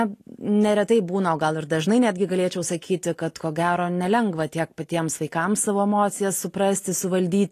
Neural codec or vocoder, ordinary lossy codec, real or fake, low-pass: none; AAC, 48 kbps; real; 14.4 kHz